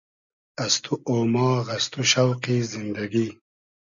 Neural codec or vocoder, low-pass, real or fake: none; 7.2 kHz; real